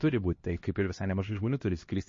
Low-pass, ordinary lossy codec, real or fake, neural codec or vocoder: 7.2 kHz; MP3, 32 kbps; fake; codec, 16 kHz, 1 kbps, X-Codec, HuBERT features, trained on LibriSpeech